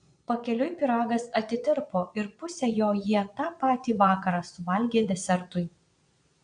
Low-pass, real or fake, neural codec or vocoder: 9.9 kHz; fake; vocoder, 22.05 kHz, 80 mel bands, Vocos